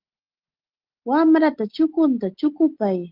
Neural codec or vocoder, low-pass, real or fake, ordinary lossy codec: none; 5.4 kHz; real; Opus, 16 kbps